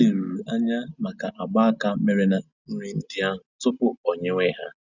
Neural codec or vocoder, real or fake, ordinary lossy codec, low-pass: none; real; none; 7.2 kHz